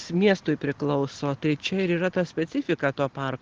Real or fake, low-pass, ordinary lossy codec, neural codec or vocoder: real; 7.2 kHz; Opus, 16 kbps; none